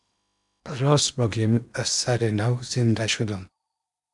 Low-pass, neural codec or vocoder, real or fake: 10.8 kHz; codec, 16 kHz in and 24 kHz out, 0.8 kbps, FocalCodec, streaming, 65536 codes; fake